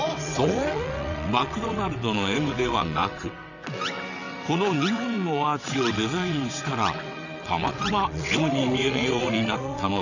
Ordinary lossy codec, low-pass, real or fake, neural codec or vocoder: none; 7.2 kHz; fake; vocoder, 22.05 kHz, 80 mel bands, WaveNeXt